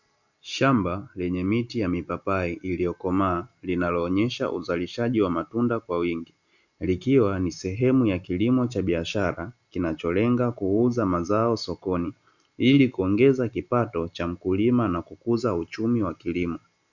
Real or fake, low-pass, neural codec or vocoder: real; 7.2 kHz; none